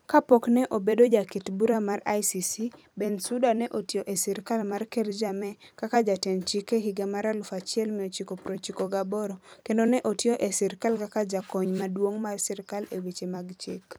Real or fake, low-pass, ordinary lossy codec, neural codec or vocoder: fake; none; none; vocoder, 44.1 kHz, 128 mel bands every 256 samples, BigVGAN v2